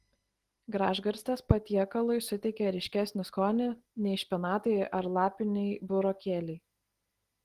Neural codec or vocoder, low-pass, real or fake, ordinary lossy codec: none; 14.4 kHz; real; Opus, 16 kbps